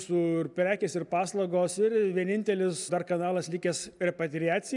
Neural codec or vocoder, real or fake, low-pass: none; real; 10.8 kHz